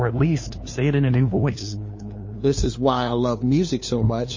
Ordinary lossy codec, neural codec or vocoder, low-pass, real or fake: MP3, 32 kbps; codec, 16 kHz, 2 kbps, FunCodec, trained on LibriTTS, 25 frames a second; 7.2 kHz; fake